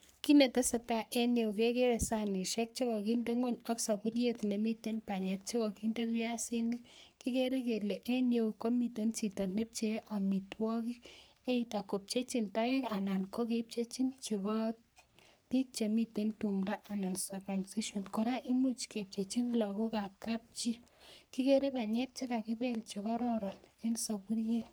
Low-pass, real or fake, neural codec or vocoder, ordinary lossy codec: none; fake; codec, 44.1 kHz, 3.4 kbps, Pupu-Codec; none